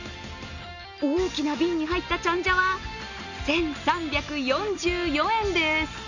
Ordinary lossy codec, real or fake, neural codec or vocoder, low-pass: none; real; none; 7.2 kHz